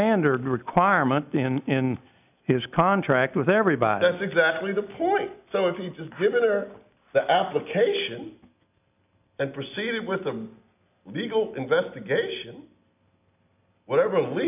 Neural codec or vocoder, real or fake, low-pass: none; real; 3.6 kHz